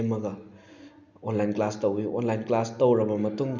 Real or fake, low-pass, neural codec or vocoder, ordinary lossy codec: real; 7.2 kHz; none; none